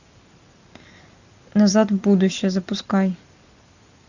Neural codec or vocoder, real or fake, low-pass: none; real; 7.2 kHz